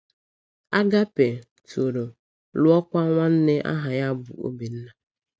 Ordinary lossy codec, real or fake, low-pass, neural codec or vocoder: none; real; none; none